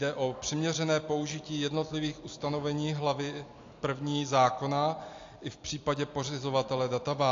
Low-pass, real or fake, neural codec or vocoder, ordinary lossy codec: 7.2 kHz; real; none; AAC, 48 kbps